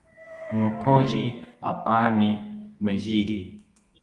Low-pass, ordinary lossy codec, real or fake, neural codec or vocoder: 10.8 kHz; Opus, 32 kbps; fake; codec, 24 kHz, 0.9 kbps, WavTokenizer, medium music audio release